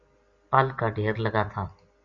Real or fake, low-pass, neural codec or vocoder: real; 7.2 kHz; none